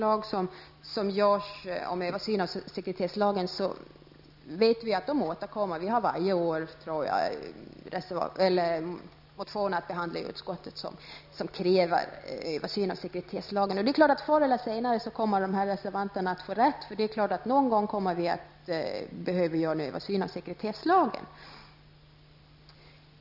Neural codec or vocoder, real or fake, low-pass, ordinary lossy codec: none; real; 5.4 kHz; none